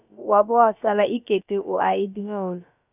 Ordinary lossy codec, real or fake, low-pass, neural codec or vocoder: none; fake; 3.6 kHz; codec, 16 kHz, about 1 kbps, DyCAST, with the encoder's durations